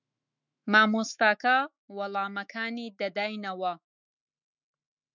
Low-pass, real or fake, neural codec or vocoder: 7.2 kHz; fake; autoencoder, 48 kHz, 128 numbers a frame, DAC-VAE, trained on Japanese speech